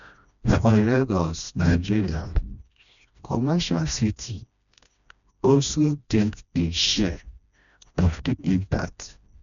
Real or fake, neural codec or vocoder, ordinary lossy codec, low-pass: fake; codec, 16 kHz, 1 kbps, FreqCodec, smaller model; none; 7.2 kHz